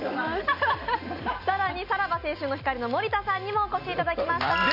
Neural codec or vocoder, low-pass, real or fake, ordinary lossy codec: none; 5.4 kHz; real; none